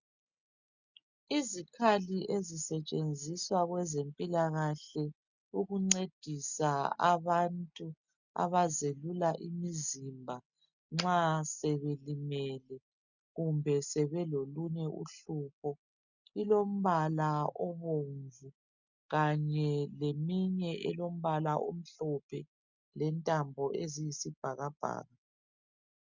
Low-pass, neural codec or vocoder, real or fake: 7.2 kHz; none; real